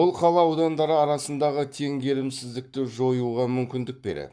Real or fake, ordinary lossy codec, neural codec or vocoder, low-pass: fake; none; codec, 44.1 kHz, 7.8 kbps, Pupu-Codec; 9.9 kHz